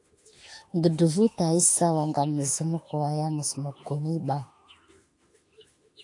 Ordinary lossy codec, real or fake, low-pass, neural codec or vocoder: AAC, 48 kbps; fake; 10.8 kHz; autoencoder, 48 kHz, 32 numbers a frame, DAC-VAE, trained on Japanese speech